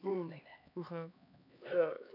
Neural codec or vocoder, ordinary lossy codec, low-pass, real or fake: codec, 16 kHz, 2 kbps, X-Codec, HuBERT features, trained on LibriSpeech; none; 5.4 kHz; fake